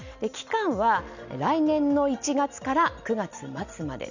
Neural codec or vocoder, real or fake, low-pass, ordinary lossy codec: none; real; 7.2 kHz; none